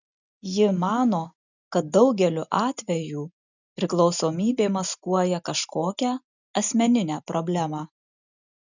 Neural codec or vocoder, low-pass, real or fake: none; 7.2 kHz; real